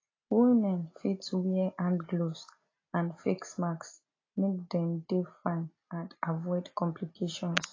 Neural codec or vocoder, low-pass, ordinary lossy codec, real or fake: none; 7.2 kHz; AAC, 32 kbps; real